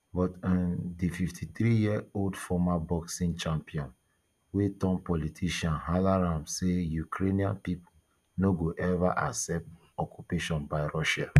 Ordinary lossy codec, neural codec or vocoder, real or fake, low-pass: none; none; real; 14.4 kHz